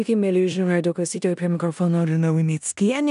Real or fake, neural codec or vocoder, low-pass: fake; codec, 16 kHz in and 24 kHz out, 0.9 kbps, LongCat-Audio-Codec, four codebook decoder; 10.8 kHz